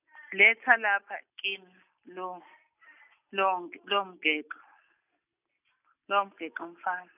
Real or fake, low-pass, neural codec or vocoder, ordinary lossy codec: real; 3.6 kHz; none; none